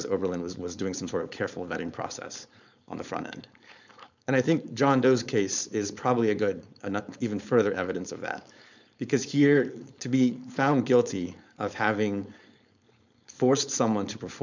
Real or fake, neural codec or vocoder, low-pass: fake; codec, 16 kHz, 4.8 kbps, FACodec; 7.2 kHz